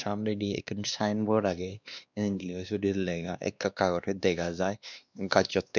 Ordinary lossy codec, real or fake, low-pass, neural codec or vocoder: none; fake; 7.2 kHz; codec, 16 kHz, 2 kbps, X-Codec, WavLM features, trained on Multilingual LibriSpeech